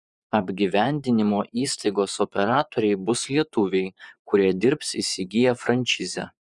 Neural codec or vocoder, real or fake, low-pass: vocoder, 24 kHz, 100 mel bands, Vocos; fake; 10.8 kHz